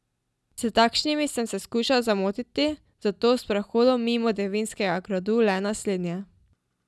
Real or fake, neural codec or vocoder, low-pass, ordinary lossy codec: real; none; none; none